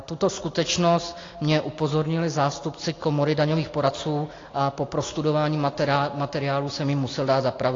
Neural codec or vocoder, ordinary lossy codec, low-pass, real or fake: none; AAC, 32 kbps; 7.2 kHz; real